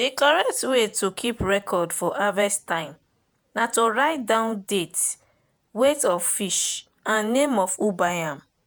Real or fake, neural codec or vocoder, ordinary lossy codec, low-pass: fake; vocoder, 48 kHz, 128 mel bands, Vocos; none; none